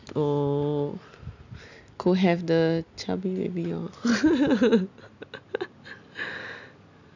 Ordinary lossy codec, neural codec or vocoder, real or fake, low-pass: none; none; real; 7.2 kHz